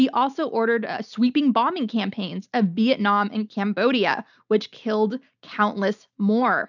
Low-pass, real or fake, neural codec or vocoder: 7.2 kHz; real; none